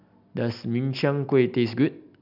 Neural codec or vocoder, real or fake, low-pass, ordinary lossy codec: none; real; 5.4 kHz; none